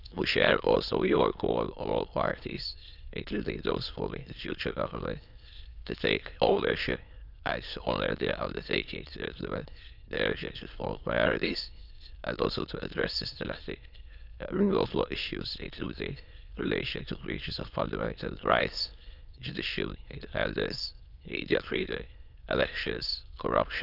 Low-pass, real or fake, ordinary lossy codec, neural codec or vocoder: 5.4 kHz; fake; none; autoencoder, 22.05 kHz, a latent of 192 numbers a frame, VITS, trained on many speakers